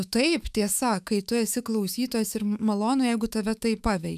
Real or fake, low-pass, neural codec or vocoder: fake; 14.4 kHz; autoencoder, 48 kHz, 128 numbers a frame, DAC-VAE, trained on Japanese speech